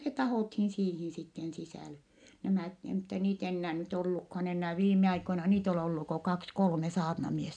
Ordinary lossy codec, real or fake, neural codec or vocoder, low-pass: none; real; none; 9.9 kHz